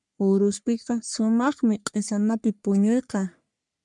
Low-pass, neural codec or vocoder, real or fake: 10.8 kHz; codec, 44.1 kHz, 3.4 kbps, Pupu-Codec; fake